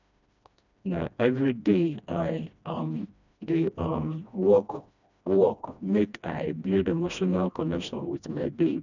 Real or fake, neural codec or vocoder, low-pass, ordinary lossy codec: fake; codec, 16 kHz, 1 kbps, FreqCodec, smaller model; 7.2 kHz; none